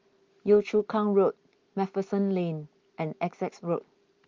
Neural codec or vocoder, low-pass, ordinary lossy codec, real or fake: none; 7.2 kHz; Opus, 32 kbps; real